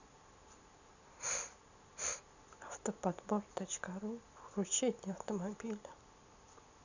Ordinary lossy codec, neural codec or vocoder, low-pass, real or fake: none; none; 7.2 kHz; real